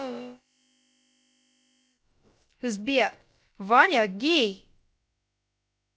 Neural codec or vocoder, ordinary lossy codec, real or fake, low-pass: codec, 16 kHz, about 1 kbps, DyCAST, with the encoder's durations; none; fake; none